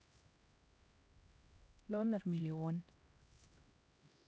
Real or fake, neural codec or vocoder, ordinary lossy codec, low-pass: fake; codec, 16 kHz, 1 kbps, X-Codec, HuBERT features, trained on LibriSpeech; none; none